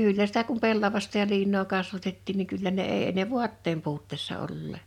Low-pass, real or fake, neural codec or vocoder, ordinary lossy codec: 19.8 kHz; real; none; none